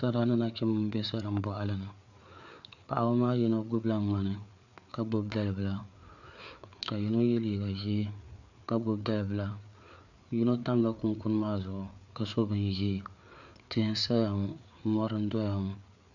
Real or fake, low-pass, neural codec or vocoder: fake; 7.2 kHz; codec, 16 kHz, 4 kbps, FreqCodec, larger model